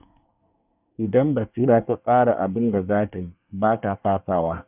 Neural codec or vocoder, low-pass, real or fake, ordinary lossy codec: codec, 24 kHz, 1 kbps, SNAC; 3.6 kHz; fake; none